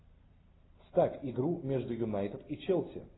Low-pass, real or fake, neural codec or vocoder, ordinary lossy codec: 7.2 kHz; real; none; AAC, 16 kbps